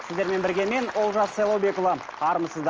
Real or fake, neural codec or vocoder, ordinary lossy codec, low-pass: real; none; Opus, 24 kbps; 7.2 kHz